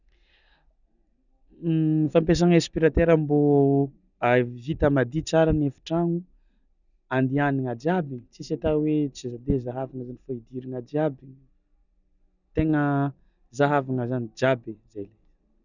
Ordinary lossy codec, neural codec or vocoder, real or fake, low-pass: none; none; real; 7.2 kHz